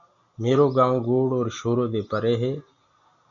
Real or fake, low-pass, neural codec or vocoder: real; 7.2 kHz; none